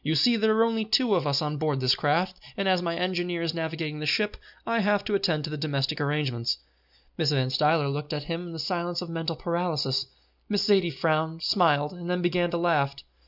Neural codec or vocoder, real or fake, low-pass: none; real; 5.4 kHz